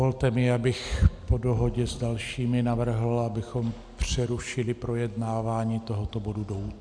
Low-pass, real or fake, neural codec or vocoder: 9.9 kHz; real; none